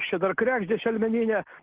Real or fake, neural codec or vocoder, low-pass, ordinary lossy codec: real; none; 3.6 kHz; Opus, 16 kbps